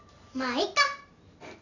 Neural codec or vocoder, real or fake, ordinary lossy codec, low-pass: none; real; none; 7.2 kHz